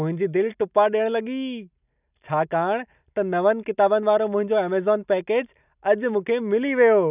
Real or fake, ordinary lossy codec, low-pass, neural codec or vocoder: real; none; 3.6 kHz; none